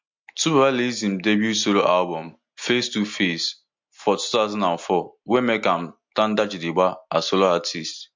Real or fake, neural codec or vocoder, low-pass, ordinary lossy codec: real; none; 7.2 kHz; MP3, 48 kbps